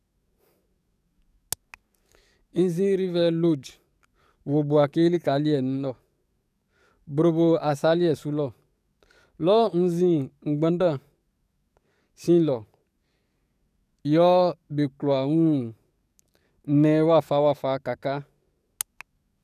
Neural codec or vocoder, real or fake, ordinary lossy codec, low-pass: codec, 44.1 kHz, 7.8 kbps, DAC; fake; none; 14.4 kHz